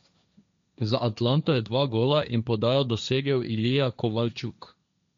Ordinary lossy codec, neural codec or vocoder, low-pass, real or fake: MP3, 64 kbps; codec, 16 kHz, 1.1 kbps, Voila-Tokenizer; 7.2 kHz; fake